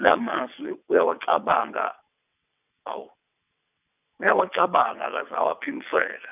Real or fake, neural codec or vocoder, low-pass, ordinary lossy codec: fake; vocoder, 22.05 kHz, 80 mel bands, WaveNeXt; 3.6 kHz; none